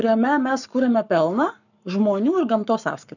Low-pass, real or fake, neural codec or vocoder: 7.2 kHz; fake; codec, 44.1 kHz, 7.8 kbps, Pupu-Codec